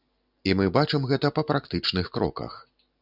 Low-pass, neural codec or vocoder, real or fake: 5.4 kHz; none; real